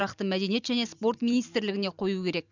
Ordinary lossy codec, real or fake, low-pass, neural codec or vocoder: none; fake; 7.2 kHz; vocoder, 44.1 kHz, 128 mel bands every 256 samples, BigVGAN v2